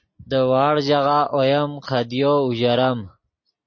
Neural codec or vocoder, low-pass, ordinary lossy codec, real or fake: none; 7.2 kHz; MP3, 32 kbps; real